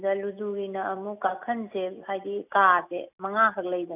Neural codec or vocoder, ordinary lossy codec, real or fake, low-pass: none; none; real; 3.6 kHz